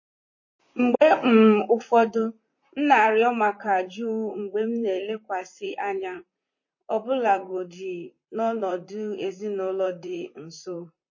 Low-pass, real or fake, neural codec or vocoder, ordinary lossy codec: 7.2 kHz; fake; vocoder, 44.1 kHz, 128 mel bands, Pupu-Vocoder; MP3, 32 kbps